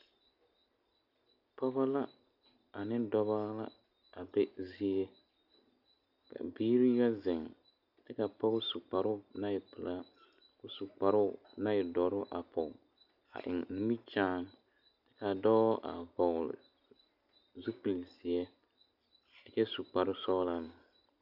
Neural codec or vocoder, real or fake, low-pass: none; real; 5.4 kHz